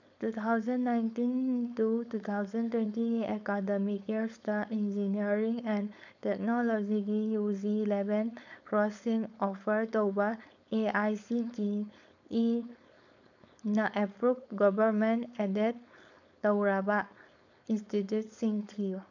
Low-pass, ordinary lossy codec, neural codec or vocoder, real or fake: 7.2 kHz; none; codec, 16 kHz, 4.8 kbps, FACodec; fake